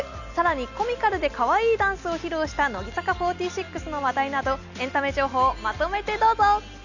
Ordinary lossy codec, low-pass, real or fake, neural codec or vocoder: none; 7.2 kHz; real; none